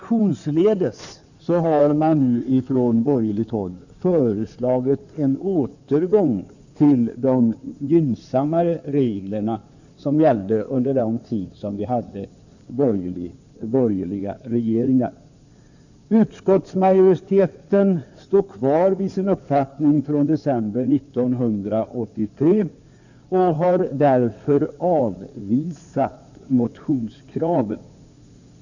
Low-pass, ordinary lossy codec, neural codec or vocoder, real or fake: 7.2 kHz; none; codec, 16 kHz in and 24 kHz out, 2.2 kbps, FireRedTTS-2 codec; fake